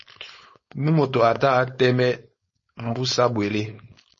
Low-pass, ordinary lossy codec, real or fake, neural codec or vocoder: 7.2 kHz; MP3, 32 kbps; fake; codec, 16 kHz, 4.8 kbps, FACodec